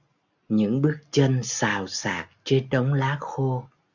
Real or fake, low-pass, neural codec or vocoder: real; 7.2 kHz; none